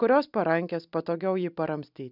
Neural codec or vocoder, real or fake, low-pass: none; real; 5.4 kHz